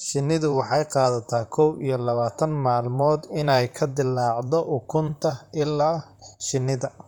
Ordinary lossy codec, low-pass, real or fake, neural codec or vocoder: none; 19.8 kHz; fake; vocoder, 44.1 kHz, 128 mel bands, Pupu-Vocoder